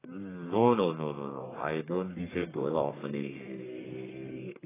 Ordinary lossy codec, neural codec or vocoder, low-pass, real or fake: AAC, 16 kbps; codec, 44.1 kHz, 1.7 kbps, Pupu-Codec; 3.6 kHz; fake